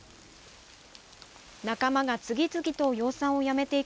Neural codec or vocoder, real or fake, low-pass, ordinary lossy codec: none; real; none; none